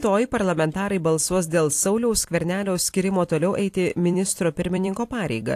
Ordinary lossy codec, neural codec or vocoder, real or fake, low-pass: AAC, 64 kbps; vocoder, 44.1 kHz, 128 mel bands every 256 samples, BigVGAN v2; fake; 14.4 kHz